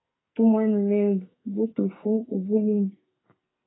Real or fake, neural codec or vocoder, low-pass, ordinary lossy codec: fake; codec, 44.1 kHz, 2.6 kbps, SNAC; 7.2 kHz; AAC, 16 kbps